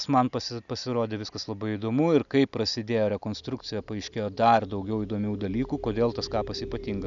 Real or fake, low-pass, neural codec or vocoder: real; 7.2 kHz; none